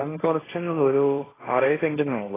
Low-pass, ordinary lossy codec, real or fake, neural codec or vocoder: 3.6 kHz; AAC, 16 kbps; fake; codec, 16 kHz, 1.1 kbps, Voila-Tokenizer